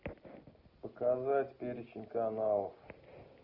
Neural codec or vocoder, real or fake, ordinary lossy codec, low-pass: none; real; Opus, 24 kbps; 5.4 kHz